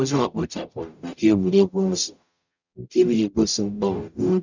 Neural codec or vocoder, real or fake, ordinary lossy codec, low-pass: codec, 44.1 kHz, 0.9 kbps, DAC; fake; none; 7.2 kHz